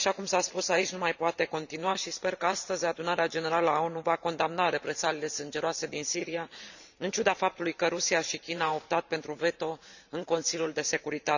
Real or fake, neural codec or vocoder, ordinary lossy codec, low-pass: fake; vocoder, 44.1 kHz, 128 mel bands every 512 samples, BigVGAN v2; none; 7.2 kHz